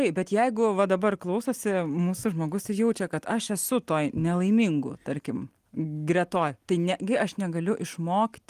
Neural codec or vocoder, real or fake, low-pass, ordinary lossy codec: none; real; 14.4 kHz; Opus, 24 kbps